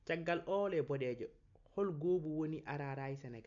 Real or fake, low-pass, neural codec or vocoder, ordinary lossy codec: real; 7.2 kHz; none; none